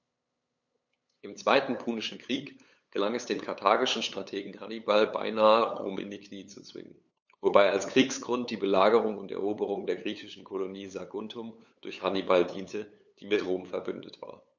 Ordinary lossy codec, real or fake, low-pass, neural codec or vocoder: none; fake; 7.2 kHz; codec, 16 kHz, 8 kbps, FunCodec, trained on LibriTTS, 25 frames a second